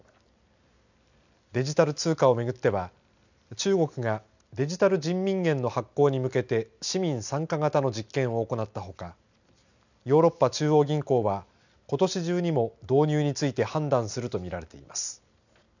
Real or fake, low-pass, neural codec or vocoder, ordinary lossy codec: real; 7.2 kHz; none; none